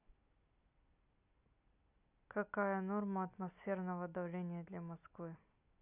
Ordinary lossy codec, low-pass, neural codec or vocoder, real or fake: none; 3.6 kHz; none; real